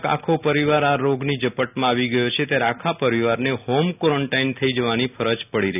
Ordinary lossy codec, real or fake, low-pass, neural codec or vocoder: none; real; 3.6 kHz; none